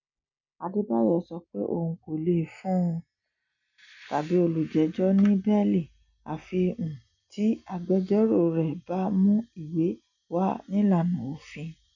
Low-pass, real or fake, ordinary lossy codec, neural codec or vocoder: 7.2 kHz; real; none; none